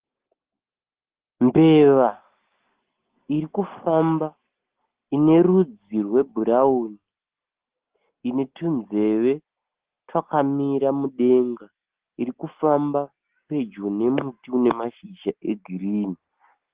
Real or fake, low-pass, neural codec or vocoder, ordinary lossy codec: real; 3.6 kHz; none; Opus, 16 kbps